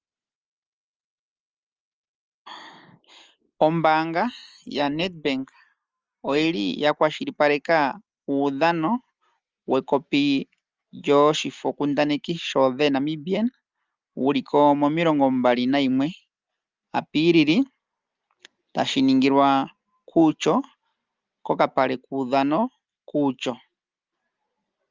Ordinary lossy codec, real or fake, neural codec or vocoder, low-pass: Opus, 24 kbps; real; none; 7.2 kHz